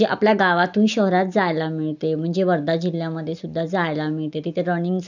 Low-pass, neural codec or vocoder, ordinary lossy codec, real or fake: 7.2 kHz; none; none; real